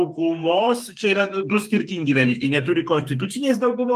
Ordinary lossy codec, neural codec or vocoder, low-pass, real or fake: Opus, 32 kbps; codec, 32 kHz, 1.9 kbps, SNAC; 14.4 kHz; fake